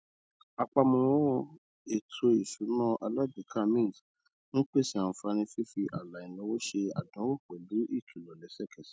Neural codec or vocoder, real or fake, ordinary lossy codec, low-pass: none; real; none; none